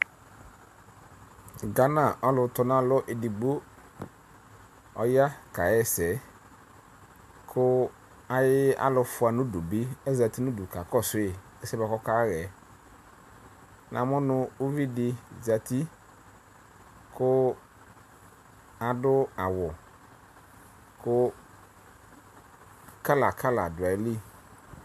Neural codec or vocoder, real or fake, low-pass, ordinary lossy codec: none; real; 14.4 kHz; MP3, 96 kbps